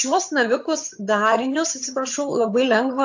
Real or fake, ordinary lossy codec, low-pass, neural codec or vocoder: fake; AAC, 48 kbps; 7.2 kHz; vocoder, 22.05 kHz, 80 mel bands, HiFi-GAN